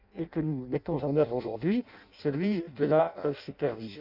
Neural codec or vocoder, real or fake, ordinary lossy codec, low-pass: codec, 16 kHz in and 24 kHz out, 0.6 kbps, FireRedTTS-2 codec; fake; none; 5.4 kHz